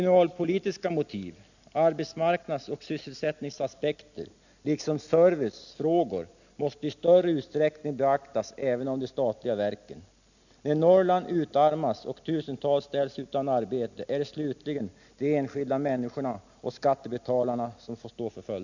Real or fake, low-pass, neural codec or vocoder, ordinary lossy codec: real; 7.2 kHz; none; none